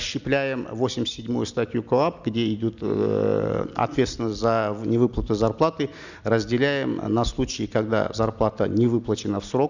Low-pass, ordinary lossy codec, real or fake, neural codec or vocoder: 7.2 kHz; none; real; none